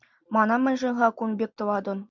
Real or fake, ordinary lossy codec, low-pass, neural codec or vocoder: real; MP3, 64 kbps; 7.2 kHz; none